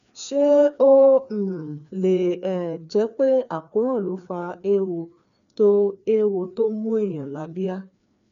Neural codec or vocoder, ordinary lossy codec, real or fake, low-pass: codec, 16 kHz, 2 kbps, FreqCodec, larger model; none; fake; 7.2 kHz